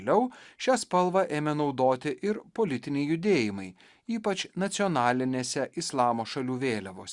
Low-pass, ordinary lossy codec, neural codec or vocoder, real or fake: 10.8 kHz; Opus, 64 kbps; none; real